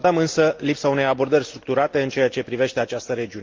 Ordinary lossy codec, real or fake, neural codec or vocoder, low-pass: Opus, 24 kbps; real; none; 7.2 kHz